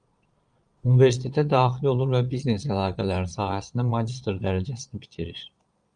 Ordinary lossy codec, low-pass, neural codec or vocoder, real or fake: Opus, 32 kbps; 9.9 kHz; vocoder, 22.05 kHz, 80 mel bands, Vocos; fake